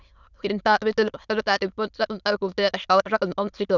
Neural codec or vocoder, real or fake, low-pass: autoencoder, 22.05 kHz, a latent of 192 numbers a frame, VITS, trained on many speakers; fake; 7.2 kHz